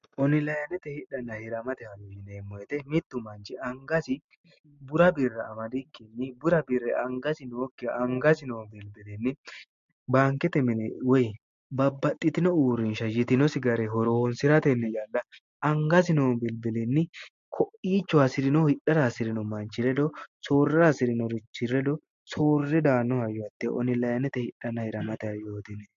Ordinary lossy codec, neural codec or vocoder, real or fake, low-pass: MP3, 48 kbps; none; real; 7.2 kHz